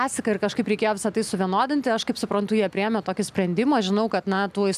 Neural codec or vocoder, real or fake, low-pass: none; real; 14.4 kHz